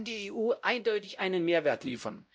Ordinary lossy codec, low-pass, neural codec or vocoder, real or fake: none; none; codec, 16 kHz, 0.5 kbps, X-Codec, WavLM features, trained on Multilingual LibriSpeech; fake